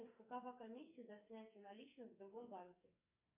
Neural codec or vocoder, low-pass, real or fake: codec, 44.1 kHz, 2.6 kbps, SNAC; 3.6 kHz; fake